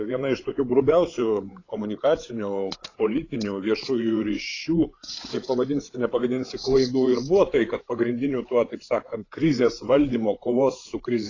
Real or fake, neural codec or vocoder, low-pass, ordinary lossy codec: fake; codec, 16 kHz, 8 kbps, FreqCodec, larger model; 7.2 kHz; AAC, 32 kbps